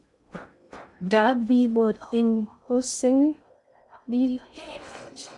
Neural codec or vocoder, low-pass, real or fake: codec, 16 kHz in and 24 kHz out, 0.6 kbps, FocalCodec, streaming, 2048 codes; 10.8 kHz; fake